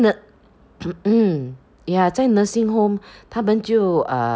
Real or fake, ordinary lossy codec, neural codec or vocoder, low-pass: real; none; none; none